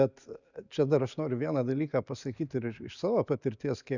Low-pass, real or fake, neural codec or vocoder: 7.2 kHz; real; none